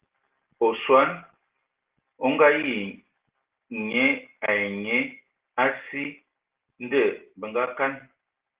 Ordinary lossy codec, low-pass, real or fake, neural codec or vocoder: Opus, 16 kbps; 3.6 kHz; real; none